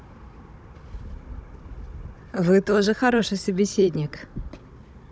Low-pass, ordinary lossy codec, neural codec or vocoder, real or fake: none; none; codec, 16 kHz, 16 kbps, FunCodec, trained on Chinese and English, 50 frames a second; fake